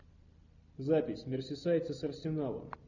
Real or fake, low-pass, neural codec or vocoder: real; 7.2 kHz; none